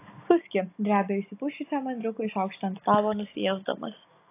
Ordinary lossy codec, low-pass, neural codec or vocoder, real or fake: AAC, 24 kbps; 3.6 kHz; none; real